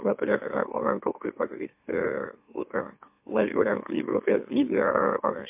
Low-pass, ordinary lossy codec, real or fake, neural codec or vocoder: 3.6 kHz; MP3, 32 kbps; fake; autoencoder, 44.1 kHz, a latent of 192 numbers a frame, MeloTTS